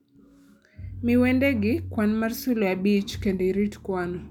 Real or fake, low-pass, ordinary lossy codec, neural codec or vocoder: real; 19.8 kHz; none; none